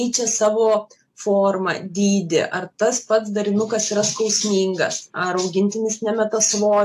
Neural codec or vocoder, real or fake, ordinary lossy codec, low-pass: none; real; AAC, 64 kbps; 14.4 kHz